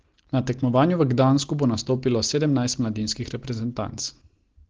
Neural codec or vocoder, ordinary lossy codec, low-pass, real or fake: none; Opus, 16 kbps; 7.2 kHz; real